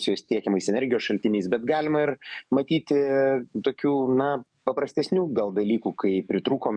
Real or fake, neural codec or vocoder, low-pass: fake; codec, 44.1 kHz, 7.8 kbps, DAC; 9.9 kHz